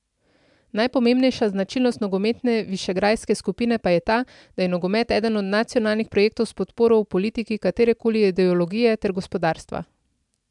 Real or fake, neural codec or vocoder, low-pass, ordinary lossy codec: real; none; 10.8 kHz; MP3, 96 kbps